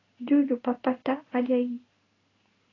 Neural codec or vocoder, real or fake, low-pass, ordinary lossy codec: codec, 16 kHz in and 24 kHz out, 1 kbps, XY-Tokenizer; fake; 7.2 kHz; AAC, 32 kbps